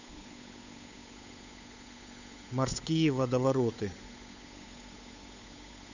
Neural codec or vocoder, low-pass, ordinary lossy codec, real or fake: codec, 16 kHz, 8 kbps, FunCodec, trained on Chinese and English, 25 frames a second; 7.2 kHz; none; fake